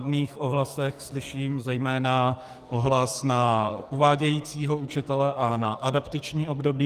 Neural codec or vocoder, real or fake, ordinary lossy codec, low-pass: codec, 44.1 kHz, 2.6 kbps, SNAC; fake; Opus, 32 kbps; 14.4 kHz